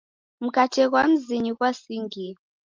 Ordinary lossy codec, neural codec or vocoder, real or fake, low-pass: Opus, 32 kbps; none; real; 7.2 kHz